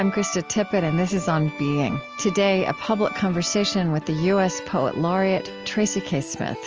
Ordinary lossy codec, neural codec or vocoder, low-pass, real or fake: Opus, 24 kbps; none; 7.2 kHz; real